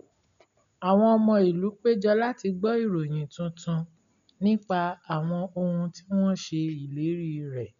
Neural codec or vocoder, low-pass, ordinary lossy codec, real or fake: none; 7.2 kHz; none; real